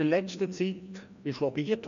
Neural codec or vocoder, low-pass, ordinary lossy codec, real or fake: codec, 16 kHz, 1 kbps, FreqCodec, larger model; 7.2 kHz; AAC, 96 kbps; fake